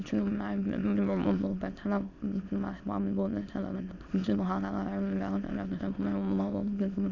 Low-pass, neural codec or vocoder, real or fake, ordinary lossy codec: 7.2 kHz; autoencoder, 22.05 kHz, a latent of 192 numbers a frame, VITS, trained on many speakers; fake; none